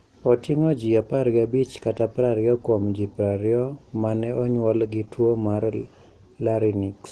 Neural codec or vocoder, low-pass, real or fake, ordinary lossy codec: none; 10.8 kHz; real; Opus, 16 kbps